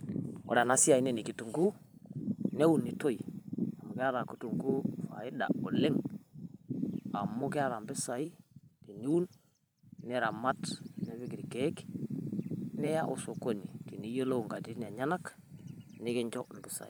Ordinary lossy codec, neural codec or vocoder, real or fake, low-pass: none; vocoder, 44.1 kHz, 128 mel bands every 512 samples, BigVGAN v2; fake; none